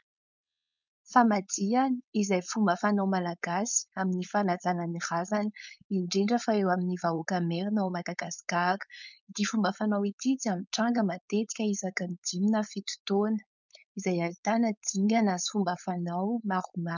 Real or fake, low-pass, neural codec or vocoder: fake; 7.2 kHz; codec, 16 kHz, 4.8 kbps, FACodec